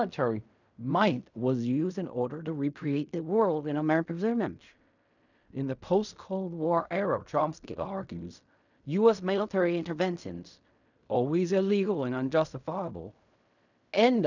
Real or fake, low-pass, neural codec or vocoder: fake; 7.2 kHz; codec, 16 kHz in and 24 kHz out, 0.4 kbps, LongCat-Audio-Codec, fine tuned four codebook decoder